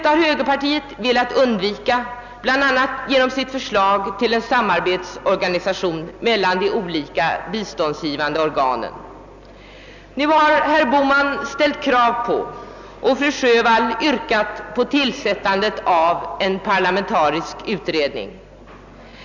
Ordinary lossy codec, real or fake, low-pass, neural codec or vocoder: none; real; 7.2 kHz; none